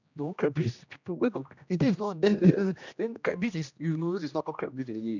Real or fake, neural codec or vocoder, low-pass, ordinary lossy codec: fake; codec, 16 kHz, 1 kbps, X-Codec, HuBERT features, trained on general audio; 7.2 kHz; none